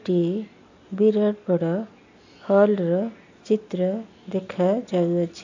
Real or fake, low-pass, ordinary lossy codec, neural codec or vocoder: real; 7.2 kHz; AAC, 48 kbps; none